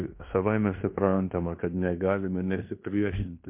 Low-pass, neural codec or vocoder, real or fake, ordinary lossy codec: 3.6 kHz; codec, 16 kHz in and 24 kHz out, 0.9 kbps, LongCat-Audio-Codec, fine tuned four codebook decoder; fake; MP3, 32 kbps